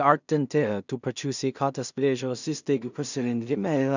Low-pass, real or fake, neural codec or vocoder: 7.2 kHz; fake; codec, 16 kHz in and 24 kHz out, 0.4 kbps, LongCat-Audio-Codec, two codebook decoder